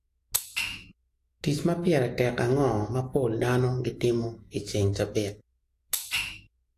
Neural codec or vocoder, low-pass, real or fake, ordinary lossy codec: autoencoder, 48 kHz, 128 numbers a frame, DAC-VAE, trained on Japanese speech; 14.4 kHz; fake; AAC, 48 kbps